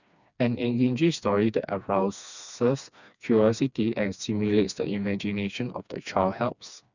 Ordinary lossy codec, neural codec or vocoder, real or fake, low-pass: none; codec, 16 kHz, 2 kbps, FreqCodec, smaller model; fake; 7.2 kHz